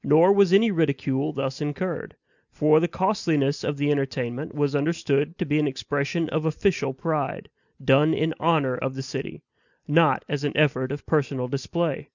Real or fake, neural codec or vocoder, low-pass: real; none; 7.2 kHz